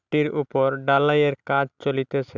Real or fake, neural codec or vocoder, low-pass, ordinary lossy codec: real; none; 7.2 kHz; none